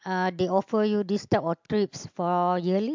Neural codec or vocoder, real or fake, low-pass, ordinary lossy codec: none; real; 7.2 kHz; MP3, 64 kbps